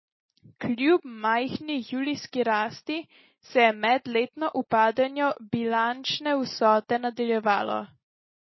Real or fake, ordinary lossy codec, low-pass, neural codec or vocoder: real; MP3, 24 kbps; 7.2 kHz; none